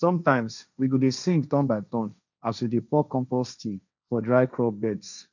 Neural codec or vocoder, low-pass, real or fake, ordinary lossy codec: codec, 16 kHz, 1.1 kbps, Voila-Tokenizer; 7.2 kHz; fake; none